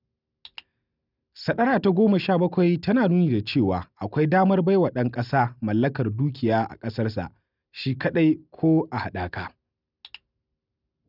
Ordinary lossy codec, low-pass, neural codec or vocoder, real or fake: none; 5.4 kHz; none; real